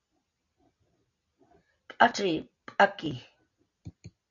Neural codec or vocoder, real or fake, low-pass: none; real; 7.2 kHz